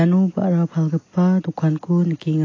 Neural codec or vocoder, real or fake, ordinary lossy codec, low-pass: none; real; AAC, 32 kbps; 7.2 kHz